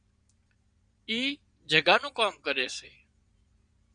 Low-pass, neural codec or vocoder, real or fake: 9.9 kHz; vocoder, 22.05 kHz, 80 mel bands, Vocos; fake